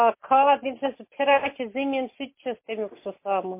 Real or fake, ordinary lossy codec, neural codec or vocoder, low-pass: real; MP3, 24 kbps; none; 3.6 kHz